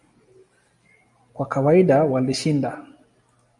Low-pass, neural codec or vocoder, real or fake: 10.8 kHz; none; real